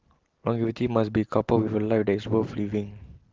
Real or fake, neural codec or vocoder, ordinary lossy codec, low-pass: real; none; Opus, 16 kbps; 7.2 kHz